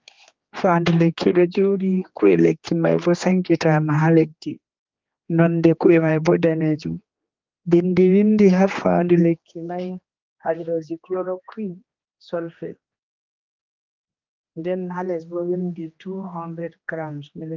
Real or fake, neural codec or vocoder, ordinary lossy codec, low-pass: fake; codec, 16 kHz, 2 kbps, X-Codec, HuBERT features, trained on general audio; Opus, 24 kbps; 7.2 kHz